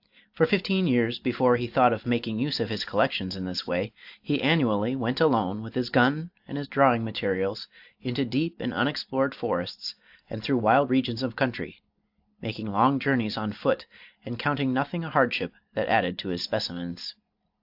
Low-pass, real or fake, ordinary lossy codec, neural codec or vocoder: 5.4 kHz; real; AAC, 48 kbps; none